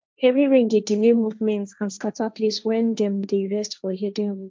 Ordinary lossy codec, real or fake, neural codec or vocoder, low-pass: none; fake; codec, 16 kHz, 1.1 kbps, Voila-Tokenizer; none